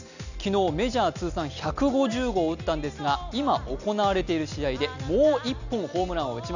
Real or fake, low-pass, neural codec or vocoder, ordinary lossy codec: real; 7.2 kHz; none; none